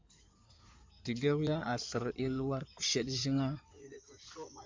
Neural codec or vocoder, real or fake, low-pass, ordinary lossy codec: codec, 16 kHz in and 24 kHz out, 2.2 kbps, FireRedTTS-2 codec; fake; 7.2 kHz; MP3, 64 kbps